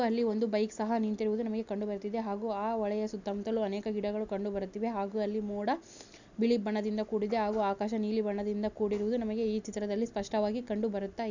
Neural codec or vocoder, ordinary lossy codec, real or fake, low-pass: none; none; real; 7.2 kHz